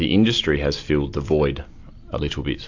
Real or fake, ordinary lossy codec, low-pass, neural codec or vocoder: real; AAC, 48 kbps; 7.2 kHz; none